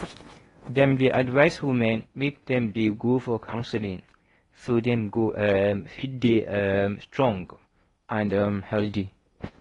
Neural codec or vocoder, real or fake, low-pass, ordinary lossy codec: codec, 16 kHz in and 24 kHz out, 0.8 kbps, FocalCodec, streaming, 65536 codes; fake; 10.8 kHz; AAC, 32 kbps